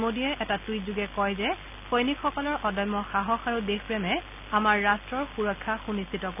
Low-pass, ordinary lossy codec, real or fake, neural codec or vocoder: 3.6 kHz; none; real; none